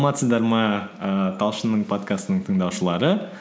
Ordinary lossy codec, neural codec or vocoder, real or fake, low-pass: none; none; real; none